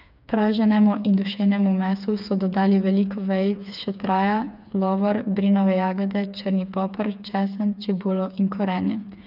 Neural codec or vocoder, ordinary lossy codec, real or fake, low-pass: codec, 16 kHz, 4 kbps, FreqCodec, smaller model; none; fake; 5.4 kHz